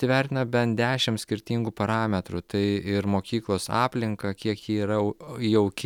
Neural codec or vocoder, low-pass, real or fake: none; 19.8 kHz; real